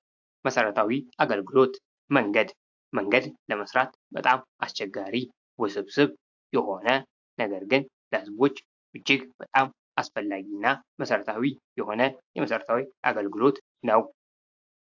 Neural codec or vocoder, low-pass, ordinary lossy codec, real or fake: vocoder, 44.1 kHz, 128 mel bands every 512 samples, BigVGAN v2; 7.2 kHz; AAC, 48 kbps; fake